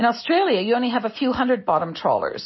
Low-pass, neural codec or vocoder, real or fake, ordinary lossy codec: 7.2 kHz; none; real; MP3, 24 kbps